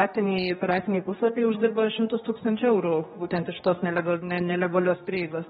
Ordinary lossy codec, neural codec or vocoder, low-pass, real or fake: AAC, 16 kbps; codec, 16 kHz, 2 kbps, X-Codec, HuBERT features, trained on general audio; 7.2 kHz; fake